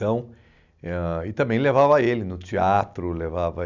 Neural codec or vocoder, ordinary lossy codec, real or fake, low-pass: none; none; real; 7.2 kHz